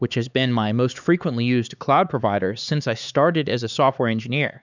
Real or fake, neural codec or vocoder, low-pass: fake; codec, 16 kHz, 4 kbps, X-Codec, HuBERT features, trained on LibriSpeech; 7.2 kHz